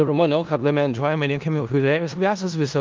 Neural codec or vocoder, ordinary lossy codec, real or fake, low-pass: codec, 16 kHz in and 24 kHz out, 0.4 kbps, LongCat-Audio-Codec, four codebook decoder; Opus, 32 kbps; fake; 7.2 kHz